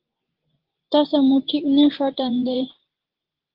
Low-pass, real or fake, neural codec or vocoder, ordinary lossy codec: 5.4 kHz; fake; vocoder, 24 kHz, 100 mel bands, Vocos; Opus, 16 kbps